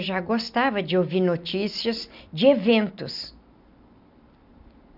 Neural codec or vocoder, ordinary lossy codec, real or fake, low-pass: none; none; real; 5.4 kHz